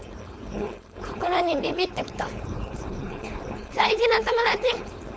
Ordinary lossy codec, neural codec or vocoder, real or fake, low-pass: none; codec, 16 kHz, 4.8 kbps, FACodec; fake; none